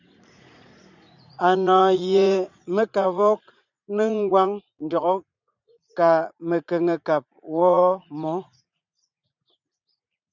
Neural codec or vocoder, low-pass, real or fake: vocoder, 44.1 kHz, 80 mel bands, Vocos; 7.2 kHz; fake